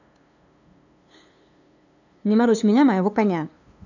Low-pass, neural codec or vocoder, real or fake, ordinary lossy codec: 7.2 kHz; codec, 16 kHz, 2 kbps, FunCodec, trained on LibriTTS, 25 frames a second; fake; none